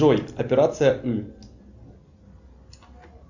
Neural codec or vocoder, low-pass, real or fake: none; 7.2 kHz; real